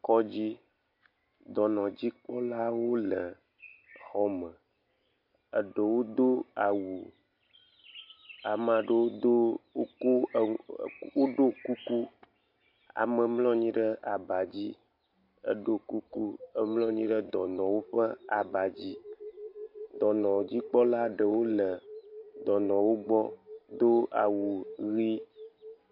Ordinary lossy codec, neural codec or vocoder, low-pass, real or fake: MP3, 32 kbps; none; 5.4 kHz; real